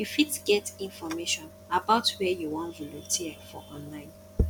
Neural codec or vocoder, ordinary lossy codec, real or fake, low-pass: none; none; real; 19.8 kHz